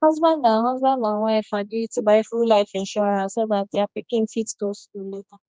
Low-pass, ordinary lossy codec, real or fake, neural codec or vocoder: none; none; fake; codec, 16 kHz, 2 kbps, X-Codec, HuBERT features, trained on general audio